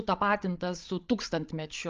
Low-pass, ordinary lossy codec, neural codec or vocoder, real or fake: 7.2 kHz; Opus, 32 kbps; codec, 16 kHz, 16 kbps, FreqCodec, larger model; fake